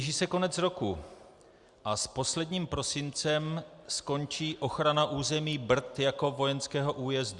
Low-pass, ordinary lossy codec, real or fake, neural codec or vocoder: 10.8 kHz; Opus, 64 kbps; real; none